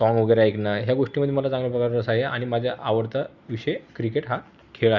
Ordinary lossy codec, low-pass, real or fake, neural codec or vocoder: Opus, 64 kbps; 7.2 kHz; real; none